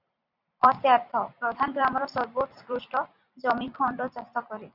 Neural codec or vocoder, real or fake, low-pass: vocoder, 44.1 kHz, 128 mel bands every 256 samples, BigVGAN v2; fake; 5.4 kHz